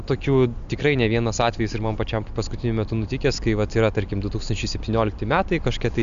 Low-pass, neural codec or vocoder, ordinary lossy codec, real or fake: 7.2 kHz; none; MP3, 96 kbps; real